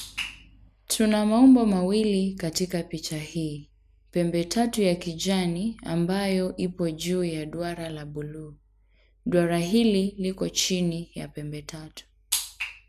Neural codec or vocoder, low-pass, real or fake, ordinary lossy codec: none; 14.4 kHz; real; none